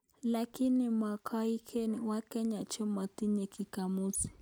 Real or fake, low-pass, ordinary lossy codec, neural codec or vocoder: real; none; none; none